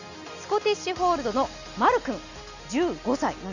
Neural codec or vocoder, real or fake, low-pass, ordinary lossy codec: none; real; 7.2 kHz; none